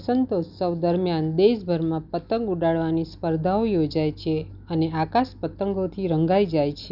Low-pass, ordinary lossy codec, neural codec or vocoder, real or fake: 5.4 kHz; none; none; real